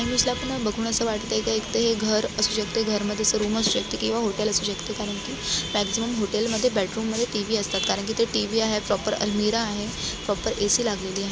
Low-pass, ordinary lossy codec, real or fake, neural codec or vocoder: none; none; real; none